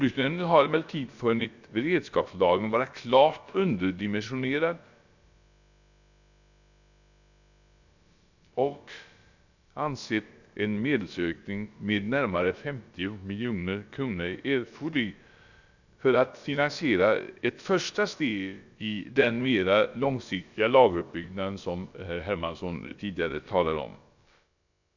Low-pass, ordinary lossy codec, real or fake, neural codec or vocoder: 7.2 kHz; none; fake; codec, 16 kHz, about 1 kbps, DyCAST, with the encoder's durations